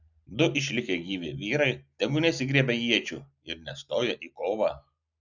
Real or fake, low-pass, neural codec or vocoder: real; 7.2 kHz; none